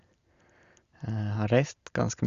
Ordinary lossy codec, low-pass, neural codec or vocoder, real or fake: Opus, 64 kbps; 7.2 kHz; none; real